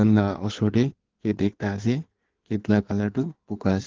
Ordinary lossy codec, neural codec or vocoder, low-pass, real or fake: Opus, 16 kbps; codec, 16 kHz in and 24 kHz out, 1.1 kbps, FireRedTTS-2 codec; 7.2 kHz; fake